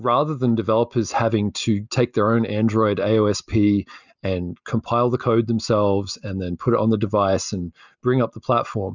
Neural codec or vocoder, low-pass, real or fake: none; 7.2 kHz; real